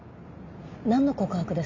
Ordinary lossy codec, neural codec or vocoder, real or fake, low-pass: MP3, 48 kbps; none; real; 7.2 kHz